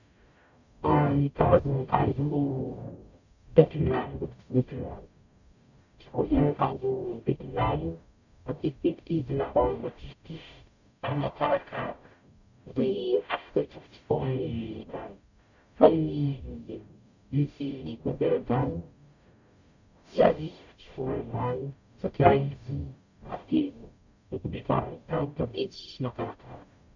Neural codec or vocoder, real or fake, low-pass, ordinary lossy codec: codec, 44.1 kHz, 0.9 kbps, DAC; fake; 7.2 kHz; none